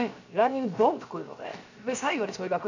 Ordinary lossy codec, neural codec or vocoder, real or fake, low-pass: none; codec, 16 kHz, 0.7 kbps, FocalCodec; fake; 7.2 kHz